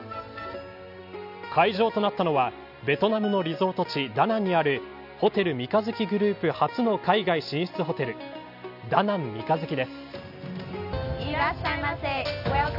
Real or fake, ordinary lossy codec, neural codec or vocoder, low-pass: real; none; none; 5.4 kHz